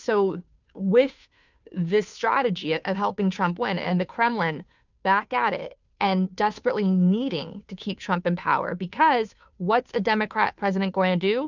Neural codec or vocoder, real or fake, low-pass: codec, 16 kHz, 2 kbps, FunCodec, trained on Chinese and English, 25 frames a second; fake; 7.2 kHz